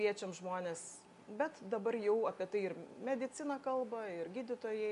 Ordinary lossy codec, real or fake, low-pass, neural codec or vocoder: AAC, 96 kbps; real; 10.8 kHz; none